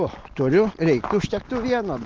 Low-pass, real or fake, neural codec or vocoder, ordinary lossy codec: 7.2 kHz; real; none; Opus, 16 kbps